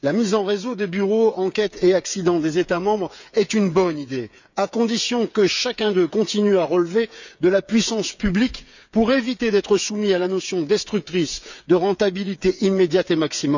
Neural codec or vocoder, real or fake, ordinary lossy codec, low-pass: codec, 16 kHz, 8 kbps, FreqCodec, smaller model; fake; none; 7.2 kHz